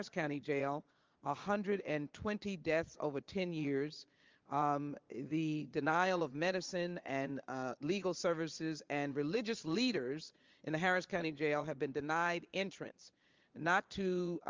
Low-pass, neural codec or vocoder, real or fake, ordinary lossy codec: 7.2 kHz; vocoder, 22.05 kHz, 80 mel bands, Vocos; fake; Opus, 32 kbps